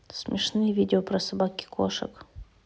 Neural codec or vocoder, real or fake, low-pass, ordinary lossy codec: none; real; none; none